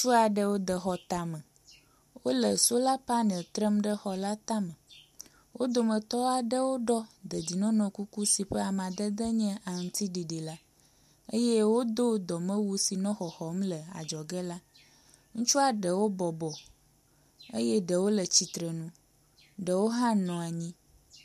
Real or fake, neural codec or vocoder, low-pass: real; none; 14.4 kHz